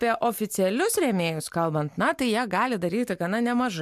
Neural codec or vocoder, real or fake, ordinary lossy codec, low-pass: none; real; MP3, 96 kbps; 14.4 kHz